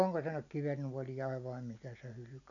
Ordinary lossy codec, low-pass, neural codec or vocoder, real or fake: none; 7.2 kHz; none; real